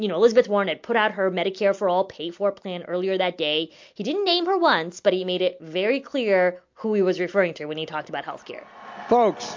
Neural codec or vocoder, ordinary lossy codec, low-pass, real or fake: none; MP3, 48 kbps; 7.2 kHz; real